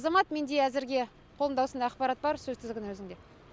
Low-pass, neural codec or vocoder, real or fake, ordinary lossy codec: none; none; real; none